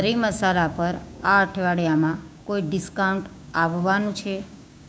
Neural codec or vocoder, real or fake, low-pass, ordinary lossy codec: codec, 16 kHz, 6 kbps, DAC; fake; none; none